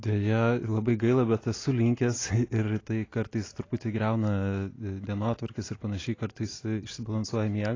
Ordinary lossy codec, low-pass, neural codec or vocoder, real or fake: AAC, 32 kbps; 7.2 kHz; none; real